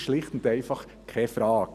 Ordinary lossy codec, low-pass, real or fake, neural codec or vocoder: none; 14.4 kHz; real; none